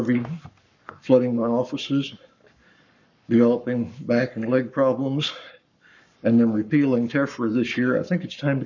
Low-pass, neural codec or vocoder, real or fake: 7.2 kHz; codec, 44.1 kHz, 7.8 kbps, Pupu-Codec; fake